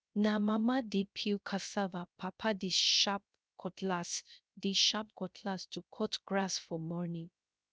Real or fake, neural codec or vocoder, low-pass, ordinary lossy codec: fake; codec, 16 kHz, 0.3 kbps, FocalCodec; none; none